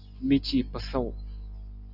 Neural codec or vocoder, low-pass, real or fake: none; 5.4 kHz; real